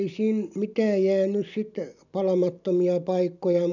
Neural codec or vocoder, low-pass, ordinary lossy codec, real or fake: none; 7.2 kHz; none; real